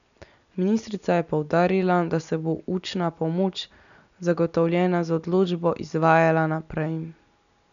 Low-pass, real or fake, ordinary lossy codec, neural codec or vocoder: 7.2 kHz; real; none; none